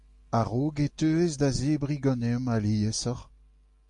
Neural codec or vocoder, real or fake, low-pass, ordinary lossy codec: none; real; 10.8 kHz; MP3, 48 kbps